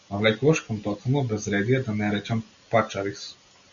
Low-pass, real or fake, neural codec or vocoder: 7.2 kHz; real; none